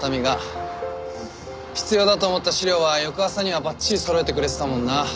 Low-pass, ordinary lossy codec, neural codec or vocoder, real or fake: none; none; none; real